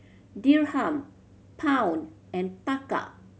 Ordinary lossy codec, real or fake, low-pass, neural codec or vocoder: none; real; none; none